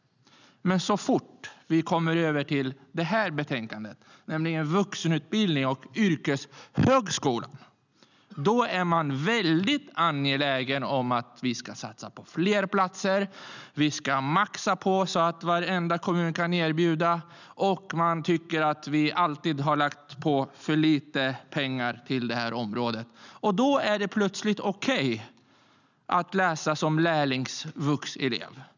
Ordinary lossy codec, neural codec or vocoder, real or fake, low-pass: none; none; real; 7.2 kHz